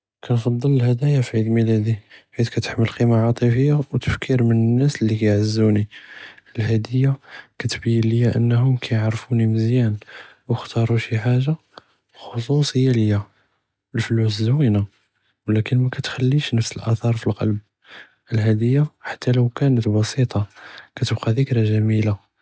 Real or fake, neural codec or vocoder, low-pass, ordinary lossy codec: real; none; none; none